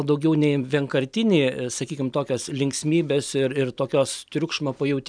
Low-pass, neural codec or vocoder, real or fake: 9.9 kHz; none; real